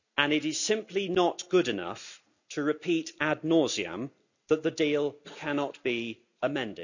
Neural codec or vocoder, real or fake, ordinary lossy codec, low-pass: none; real; none; 7.2 kHz